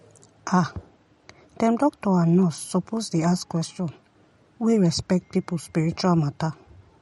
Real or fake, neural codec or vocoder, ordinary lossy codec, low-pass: real; none; MP3, 48 kbps; 19.8 kHz